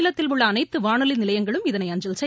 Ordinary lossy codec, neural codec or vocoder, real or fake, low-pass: none; none; real; none